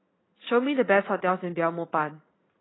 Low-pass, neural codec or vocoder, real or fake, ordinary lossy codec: 7.2 kHz; vocoder, 44.1 kHz, 80 mel bands, Vocos; fake; AAC, 16 kbps